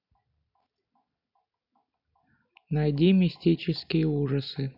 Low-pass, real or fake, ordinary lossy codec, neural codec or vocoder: 5.4 kHz; real; none; none